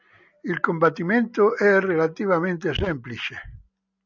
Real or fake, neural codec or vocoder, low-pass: real; none; 7.2 kHz